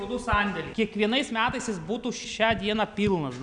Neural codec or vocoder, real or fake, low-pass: none; real; 9.9 kHz